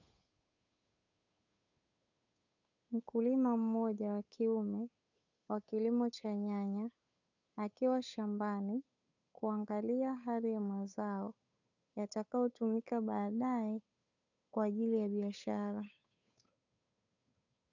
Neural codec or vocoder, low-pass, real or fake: codec, 16 kHz, 8 kbps, FunCodec, trained on Chinese and English, 25 frames a second; 7.2 kHz; fake